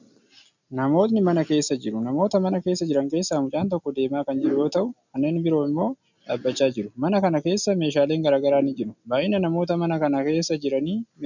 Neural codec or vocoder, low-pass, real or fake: none; 7.2 kHz; real